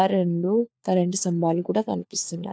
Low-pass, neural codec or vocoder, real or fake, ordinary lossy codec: none; codec, 16 kHz, 4 kbps, FunCodec, trained on LibriTTS, 50 frames a second; fake; none